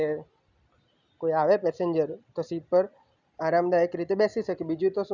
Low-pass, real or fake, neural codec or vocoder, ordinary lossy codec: 7.2 kHz; real; none; none